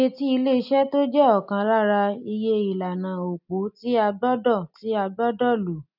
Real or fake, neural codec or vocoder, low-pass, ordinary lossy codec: real; none; 5.4 kHz; none